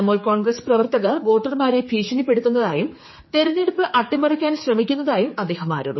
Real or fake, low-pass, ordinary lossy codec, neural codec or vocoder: fake; 7.2 kHz; MP3, 24 kbps; codec, 16 kHz, 4 kbps, X-Codec, HuBERT features, trained on general audio